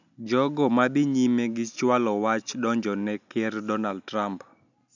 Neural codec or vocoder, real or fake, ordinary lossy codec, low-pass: none; real; none; 7.2 kHz